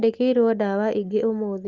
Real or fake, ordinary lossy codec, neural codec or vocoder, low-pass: real; Opus, 32 kbps; none; 7.2 kHz